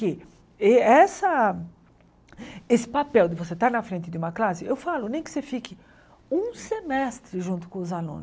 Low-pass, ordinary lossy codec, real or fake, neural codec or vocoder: none; none; real; none